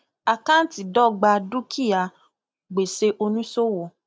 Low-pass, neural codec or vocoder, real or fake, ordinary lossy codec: none; none; real; none